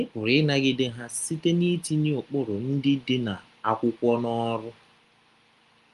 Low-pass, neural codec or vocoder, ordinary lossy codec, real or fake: 10.8 kHz; none; Opus, 32 kbps; real